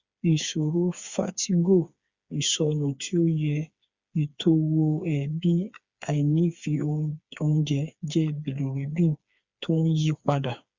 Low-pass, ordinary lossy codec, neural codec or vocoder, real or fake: 7.2 kHz; Opus, 64 kbps; codec, 16 kHz, 4 kbps, FreqCodec, smaller model; fake